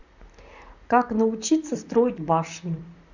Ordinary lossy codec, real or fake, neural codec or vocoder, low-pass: none; fake; vocoder, 44.1 kHz, 128 mel bands, Pupu-Vocoder; 7.2 kHz